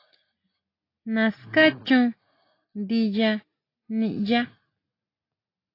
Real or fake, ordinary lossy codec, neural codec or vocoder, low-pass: real; AAC, 32 kbps; none; 5.4 kHz